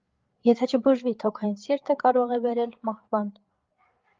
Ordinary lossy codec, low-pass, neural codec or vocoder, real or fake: Opus, 32 kbps; 7.2 kHz; codec, 16 kHz, 8 kbps, FreqCodec, larger model; fake